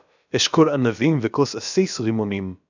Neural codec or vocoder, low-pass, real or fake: codec, 16 kHz, about 1 kbps, DyCAST, with the encoder's durations; 7.2 kHz; fake